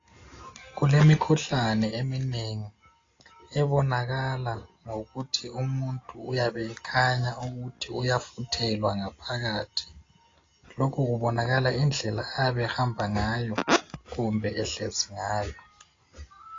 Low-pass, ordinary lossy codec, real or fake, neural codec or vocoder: 7.2 kHz; AAC, 32 kbps; real; none